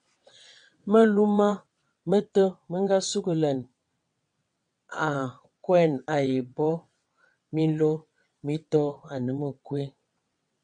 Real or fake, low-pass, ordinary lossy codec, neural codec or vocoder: fake; 9.9 kHz; MP3, 96 kbps; vocoder, 22.05 kHz, 80 mel bands, WaveNeXt